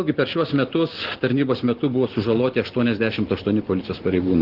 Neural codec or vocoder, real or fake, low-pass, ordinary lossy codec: none; real; 5.4 kHz; Opus, 16 kbps